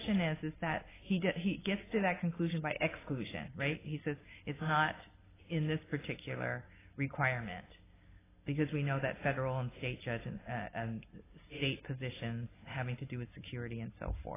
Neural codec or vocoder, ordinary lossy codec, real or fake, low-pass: none; AAC, 16 kbps; real; 3.6 kHz